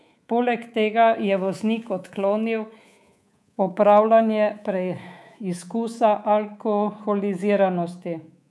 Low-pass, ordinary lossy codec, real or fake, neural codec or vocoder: none; none; fake; codec, 24 kHz, 3.1 kbps, DualCodec